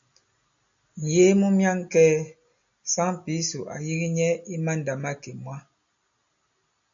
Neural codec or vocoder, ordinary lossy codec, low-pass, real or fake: none; AAC, 64 kbps; 7.2 kHz; real